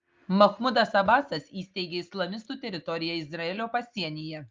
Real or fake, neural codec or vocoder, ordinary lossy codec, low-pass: real; none; Opus, 24 kbps; 7.2 kHz